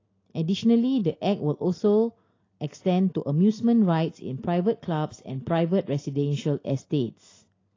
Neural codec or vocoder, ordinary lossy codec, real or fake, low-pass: none; AAC, 32 kbps; real; 7.2 kHz